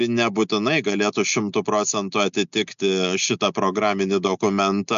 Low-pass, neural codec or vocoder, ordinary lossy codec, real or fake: 7.2 kHz; none; MP3, 64 kbps; real